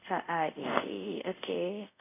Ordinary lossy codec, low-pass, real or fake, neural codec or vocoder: none; 3.6 kHz; fake; codec, 24 kHz, 0.5 kbps, DualCodec